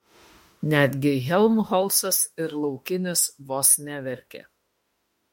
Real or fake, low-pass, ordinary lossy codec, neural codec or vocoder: fake; 19.8 kHz; MP3, 64 kbps; autoencoder, 48 kHz, 32 numbers a frame, DAC-VAE, trained on Japanese speech